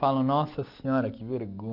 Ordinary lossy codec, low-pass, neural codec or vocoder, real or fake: none; 5.4 kHz; none; real